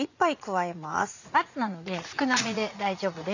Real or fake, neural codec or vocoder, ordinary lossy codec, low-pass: fake; vocoder, 44.1 kHz, 80 mel bands, Vocos; none; 7.2 kHz